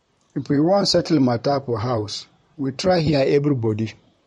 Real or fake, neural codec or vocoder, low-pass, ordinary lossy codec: fake; vocoder, 44.1 kHz, 128 mel bands, Pupu-Vocoder; 19.8 kHz; MP3, 48 kbps